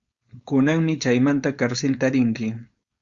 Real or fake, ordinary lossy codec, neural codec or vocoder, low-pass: fake; Opus, 64 kbps; codec, 16 kHz, 4.8 kbps, FACodec; 7.2 kHz